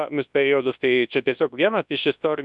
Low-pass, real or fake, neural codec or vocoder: 10.8 kHz; fake; codec, 24 kHz, 0.9 kbps, WavTokenizer, large speech release